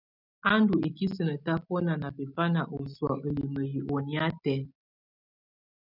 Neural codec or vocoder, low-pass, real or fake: none; 5.4 kHz; real